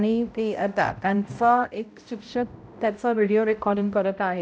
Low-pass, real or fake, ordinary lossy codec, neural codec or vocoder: none; fake; none; codec, 16 kHz, 0.5 kbps, X-Codec, HuBERT features, trained on balanced general audio